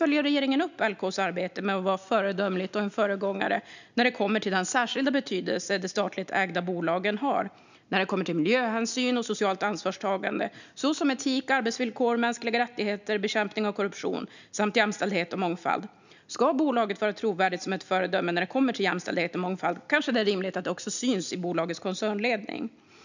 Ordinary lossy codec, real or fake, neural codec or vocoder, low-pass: none; real; none; 7.2 kHz